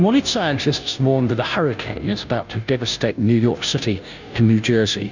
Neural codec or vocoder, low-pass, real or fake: codec, 16 kHz, 0.5 kbps, FunCodec, trained on Chinese and English, 25 frames a second; 7.2 kHz; fake